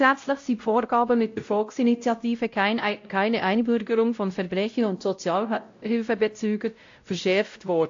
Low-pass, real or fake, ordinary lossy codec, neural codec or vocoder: 7.2 kHz; fake; AAC, 48 kbps; codec, 16 kHz, 0.5 kbps, X-Codec, WavLM features, trained on Multilingual LibriSpeech